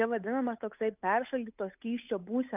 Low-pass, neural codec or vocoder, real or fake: 3.6 kHz; codec, 16 kHz, 8 kbps, FunCodec, trained on Chinese and English, 25 frames a second; fake